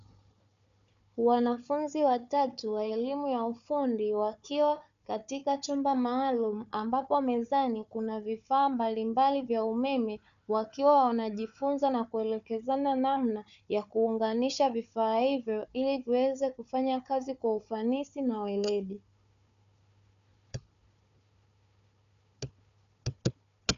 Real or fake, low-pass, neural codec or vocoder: fake; 7.2 kHz; codec, 16 kHz, 4 kbps, FunCodec, trained on Chinese and English, 50 frames a second